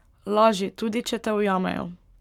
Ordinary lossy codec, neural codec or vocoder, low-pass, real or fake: none; codec, 44.1 kHz, 7.8 kbps, Pupu-Codec; 19.8 kHz; fake